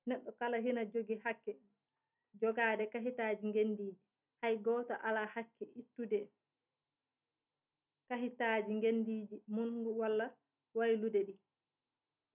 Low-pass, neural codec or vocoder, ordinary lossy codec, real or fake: 3.6 kHz; none; none; real